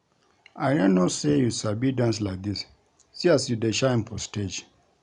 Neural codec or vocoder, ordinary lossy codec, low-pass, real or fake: none; none; 10.8 kHz; real